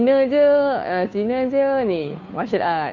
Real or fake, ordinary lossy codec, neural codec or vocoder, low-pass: fake; MP3, 48 kbps; codec, 16 kHz, 2 kbps, FunCodec, trained on Chinese and English, 25 frames a second; 7.2 kHz